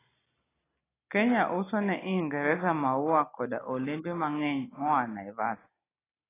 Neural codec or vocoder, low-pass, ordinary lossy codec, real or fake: none; 3.6 kHz; AAC, 16 kbps; real